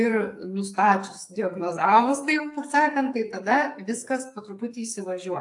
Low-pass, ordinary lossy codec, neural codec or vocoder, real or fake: 10.8 kHz; AAC, 64 kbps; codec, 44.1 kHz, 2.6 kbps, SNAC; fake